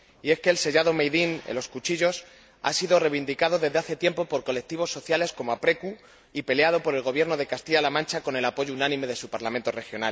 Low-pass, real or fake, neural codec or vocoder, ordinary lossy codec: none; real; none; none